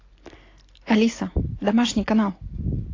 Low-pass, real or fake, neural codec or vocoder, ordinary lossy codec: 7.2 kHz; real; none; AAC, 32 kbps